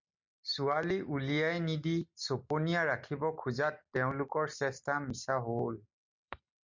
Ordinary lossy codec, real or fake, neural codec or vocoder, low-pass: MP3, 64 kbps; real; none; 7.2 kHz